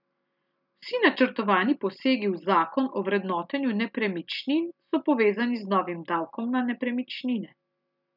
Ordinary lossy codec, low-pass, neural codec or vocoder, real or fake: none; 5.4 kHz; none; real